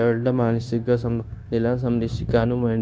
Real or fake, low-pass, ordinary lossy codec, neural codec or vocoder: fake; none; none; codec, 16 kHz, 0.9 kbps, LongCat-Audio-Codec